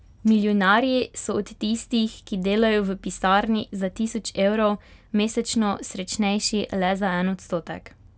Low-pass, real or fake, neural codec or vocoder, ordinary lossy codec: none; real; none; none